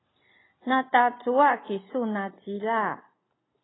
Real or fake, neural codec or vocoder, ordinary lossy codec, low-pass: real; none; AAC, 16 kbps; 7.2 kHz